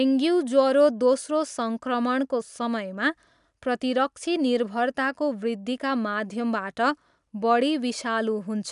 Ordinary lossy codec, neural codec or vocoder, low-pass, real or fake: none; none; 10.8 kHz; real